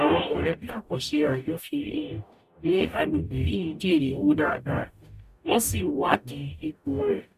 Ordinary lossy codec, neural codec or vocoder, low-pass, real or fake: none; codec, 44.1 kHz, 0.9 kbps, DAC; 14.4 kHz; fake